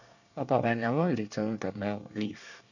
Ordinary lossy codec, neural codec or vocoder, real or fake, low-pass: none; codec, 24 kHz, 1 kbps, SNAC; fake; 7.2 kHz